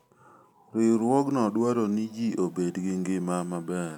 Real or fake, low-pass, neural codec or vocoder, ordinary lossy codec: real; 19.8 kHz; none; none